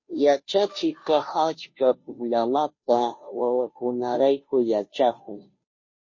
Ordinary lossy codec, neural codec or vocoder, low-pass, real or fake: MP3, 32 kbps; codec, 16 kHz, 0.5 kbps, FunCodec, trained on Chinese and English, 25 frames a second; 7.2 kHz; fake